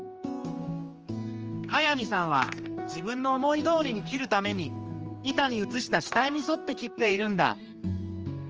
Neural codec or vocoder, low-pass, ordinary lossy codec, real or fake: codec, 16 kHz, 2 kbps, X-Codec, HuBERT features, trained on general audio; 7.2 kHz; Opus, 24 kbps; fake